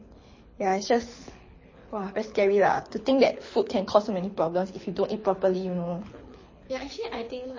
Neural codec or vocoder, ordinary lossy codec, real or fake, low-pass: codec, 24 kHz, 6 kbps, HILCodec; MP3, 32 kbps; fake; 7.2 kHz